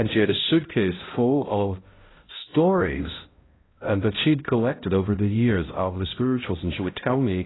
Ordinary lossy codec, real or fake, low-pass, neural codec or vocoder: AAC, 16 kbps; fake; 7.2 kHz; codec, 16 kHz, 0.5 kbps, X-Codec, HuBERT features, trained on balanced general audio